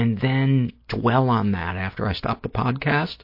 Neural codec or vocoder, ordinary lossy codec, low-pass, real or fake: none; MP3, 32 kbps; 5.4 kHz; real